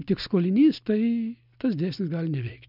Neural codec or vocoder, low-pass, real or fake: none; 5.4 kHz; real